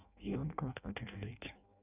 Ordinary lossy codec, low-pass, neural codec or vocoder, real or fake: none; 3.6 kHz; codec, 16 kHz in and 24 kHz out, 0.6 kbps, FireRedTTS-2 codec; fake